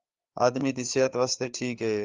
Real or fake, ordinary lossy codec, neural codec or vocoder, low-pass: fake; Opus, 32 kbps; codec, 16 kHz, 4 kbps, FreqCodec, larger model; 7.2 kHz